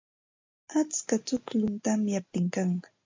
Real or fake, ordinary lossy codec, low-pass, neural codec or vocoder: real; MP3, 64 kbps; 7.2 kHz; none